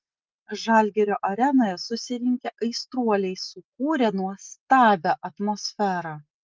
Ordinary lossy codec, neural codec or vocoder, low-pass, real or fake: Opus, 32 kbps; none; 7.2 kHz; real